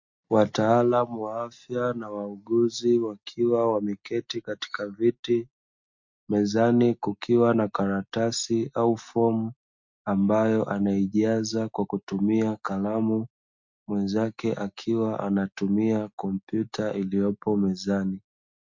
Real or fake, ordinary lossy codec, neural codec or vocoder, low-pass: real; MP3, 48 kbps; none; 7.2 kHz